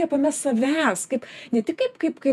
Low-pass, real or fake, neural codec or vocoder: 14.4 kHz; fake; vocoder, 48 kHz, 128 mel bands, Vocos